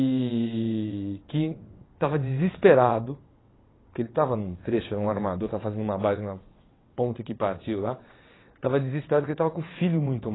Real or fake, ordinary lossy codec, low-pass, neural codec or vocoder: fake; AAC, 16 kbps; 7.2 kHz; vocoder, 22.05 kHz, 80 mel bands, WaveNeXt